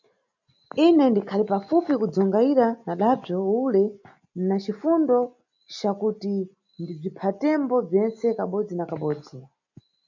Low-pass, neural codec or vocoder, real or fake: 7.2 kHz; none; real